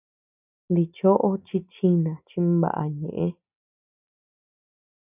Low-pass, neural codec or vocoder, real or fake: 3.6 kHz; none; real